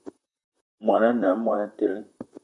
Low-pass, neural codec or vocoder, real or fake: 10.8 kHz; vocoder, 44.1 kHz, 128 mel bands, Pupu-Vocoder; fake